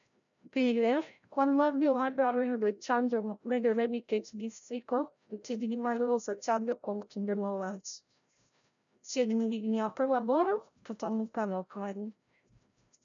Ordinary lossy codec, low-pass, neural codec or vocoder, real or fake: none; 7.2 kHz; codec, 16 kHz, 0.5 kbps, FreqCodec, larger model; fake